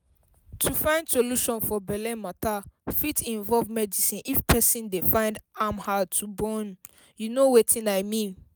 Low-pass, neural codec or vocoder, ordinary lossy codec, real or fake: none; none; none; real